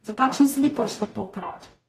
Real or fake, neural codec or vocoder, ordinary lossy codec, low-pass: fake; codec, 44.1 kHz, 0.9 kbps, DAC; AAC, 48 kbps; 14.4 kHz